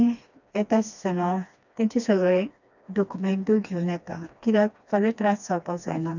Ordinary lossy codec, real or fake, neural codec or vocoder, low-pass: none; fake; codec, 16 kHz, 2 kbps, FreqCodec, smaller model; 7.2 kHz